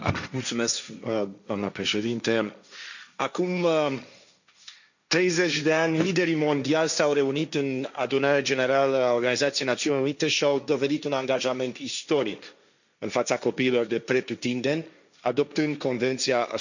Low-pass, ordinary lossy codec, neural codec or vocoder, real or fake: 7.2 kHz; none; codec, 16 kHz, 1.1 kbps, Voila-Tokenizer; fake